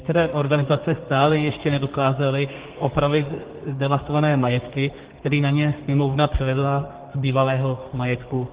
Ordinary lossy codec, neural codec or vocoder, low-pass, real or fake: Opus, 16 kbps; codec, 32 kHz, 1.9 kbps, SNAC; 3.6 kHz; fake